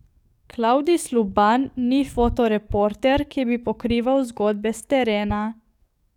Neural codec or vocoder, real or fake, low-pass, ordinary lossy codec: codec, 44.1 kHz, 7.8 kbps, DAC; fake; 19.8 kHz; none